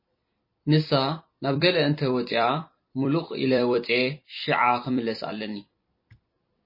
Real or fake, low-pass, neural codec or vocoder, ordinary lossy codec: fake; 5.4 kHz; vocoder, 44.1 kHz, 128 mel bands every 256 samples, BigVGAN v2; MP3, 24 kbps